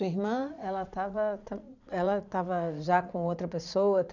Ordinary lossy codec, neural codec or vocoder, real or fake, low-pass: none; vocoder, 44.1 kHz, 80 mel bands, Vocos; fake; 7.2 kHz